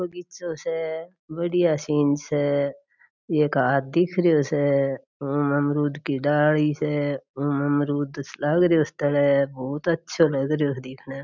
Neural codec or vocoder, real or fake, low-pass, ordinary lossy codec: none; real; 7.2 kHz; none